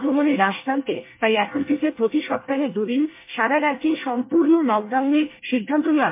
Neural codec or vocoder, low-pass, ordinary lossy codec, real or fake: codec, 24 kHz, 1 kbps, SNAC; 3.6 kHz; MP3, 24 kbps; fake